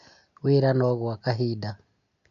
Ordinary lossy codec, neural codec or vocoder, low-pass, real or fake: none; none; 7.2 kHz; real